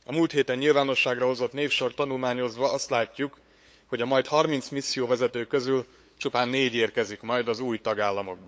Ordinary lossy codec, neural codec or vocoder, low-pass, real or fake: none; codec, 16 kHz, 8 kbps, FunCodec, trained on LibriTTS, 25 frames a second; none; fake